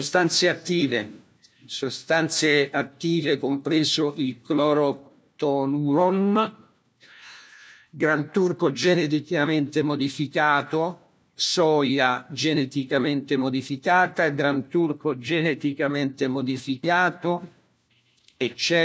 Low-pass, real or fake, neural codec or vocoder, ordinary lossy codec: none; fake; codec, 16 kHz, 1 kbps, FunCodec, trained on LibriTTS, 50 frames a second; none